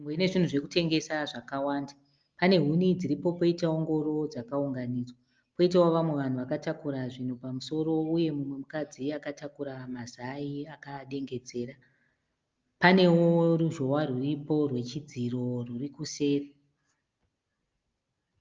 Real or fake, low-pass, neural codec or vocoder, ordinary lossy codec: real; 7.2 kHz; none; Opus, 24 kbps